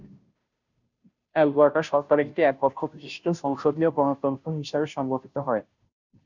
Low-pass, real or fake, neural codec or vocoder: 7.2 kHz; fake; codec, 16 kHz, 0.5 kbps, FunCodec, trained on Chinese and English, 25 frames a second